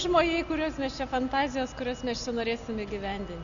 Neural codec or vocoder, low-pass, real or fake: none; 7.2 kHz; real